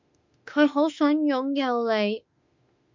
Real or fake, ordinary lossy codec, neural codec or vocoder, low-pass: fake; MP3, 64 kbps; autoencoder, 48 kHz, 32 numbers a frame, DAC-VAE, trained on Japanese speech; 7.2 kHz